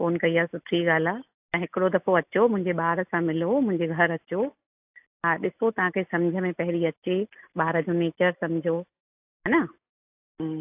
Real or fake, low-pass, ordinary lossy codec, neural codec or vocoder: real; 3.6 kHz; AAC, 32 kbps; none